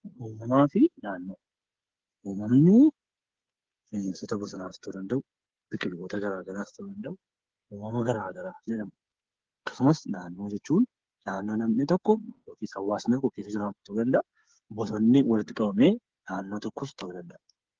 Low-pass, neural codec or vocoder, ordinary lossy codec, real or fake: 7.2 kHz; codec, 16 kHz, 8 kbps, FreqCodec, smaller model; Opus, 16 kbps; fake